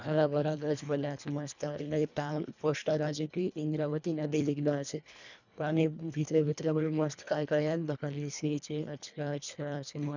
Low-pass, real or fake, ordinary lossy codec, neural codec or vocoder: 7.2 kHz; fake; none; codec, 24 kHz, 1.5 kbps, HILCodec